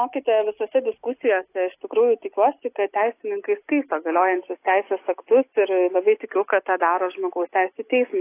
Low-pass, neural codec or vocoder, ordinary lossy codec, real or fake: 3.6 kHz; none; AAC, 24 kbps; real